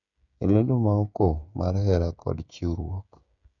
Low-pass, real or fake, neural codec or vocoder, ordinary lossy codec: 7.2 kHz; fake; codec, 16 kHz, 16 kbps, FreqCodec, smaller model; none